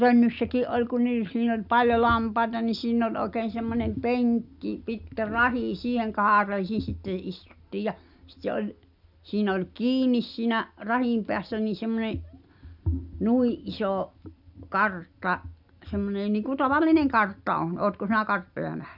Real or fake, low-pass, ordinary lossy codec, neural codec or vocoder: real; 5.4 kHz; none; none